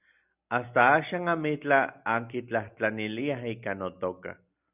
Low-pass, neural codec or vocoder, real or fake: 3.6 kHz; none; real